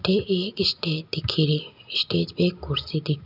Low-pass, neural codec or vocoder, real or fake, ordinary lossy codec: 5.4 kHz; none; real; none